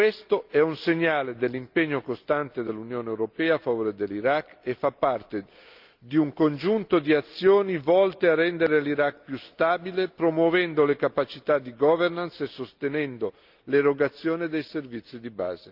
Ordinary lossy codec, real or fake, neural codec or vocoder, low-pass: Opus, 24 kbps; real; none; 5.4 kHz